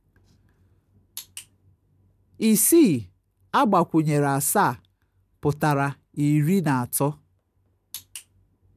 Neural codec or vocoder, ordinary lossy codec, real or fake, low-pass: none; none; real; 14.4 kHz